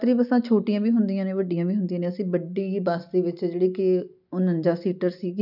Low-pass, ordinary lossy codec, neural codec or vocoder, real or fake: 5.4 kHz; none; none; real